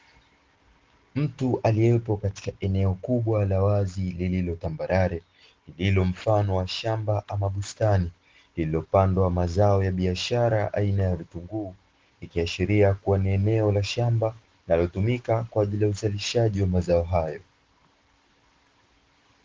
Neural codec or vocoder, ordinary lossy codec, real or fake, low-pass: none; Opus, 16 kbps; real; 7.2 kHz